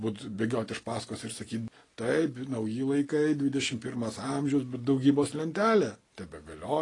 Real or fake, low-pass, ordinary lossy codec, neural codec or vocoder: real; 10.8 kHz; AAC, 32 kbps; none